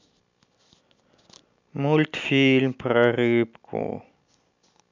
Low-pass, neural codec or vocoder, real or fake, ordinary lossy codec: 7.2 kHz; none; real; MP3, 64 kbps